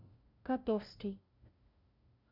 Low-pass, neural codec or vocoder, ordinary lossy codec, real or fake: 5.4 kHz; codec, 16 kHz, 0.5 kbps, FunCodec, trained on LibriTTS, 25 frames a second; MP3, 48 kbps; fake